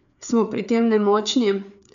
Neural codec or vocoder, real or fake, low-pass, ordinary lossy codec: codec, 16 kHz, 4 kbps, FreqCodec, larger model; fake; 7.2 kHz; none